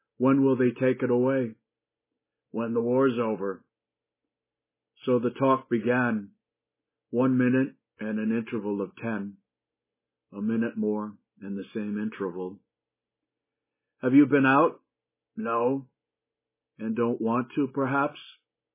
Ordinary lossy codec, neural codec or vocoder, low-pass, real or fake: MP3, 16 kbps; none; 3.6 kHz; real